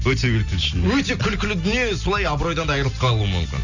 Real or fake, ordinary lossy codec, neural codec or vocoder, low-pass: real; none; none; 7.2 kHz